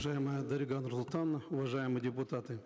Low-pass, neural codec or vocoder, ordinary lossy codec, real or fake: none; none; none; real